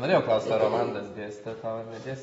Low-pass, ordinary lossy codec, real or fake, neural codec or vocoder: 14.4 kHz; AAC, 24 kbps; real; none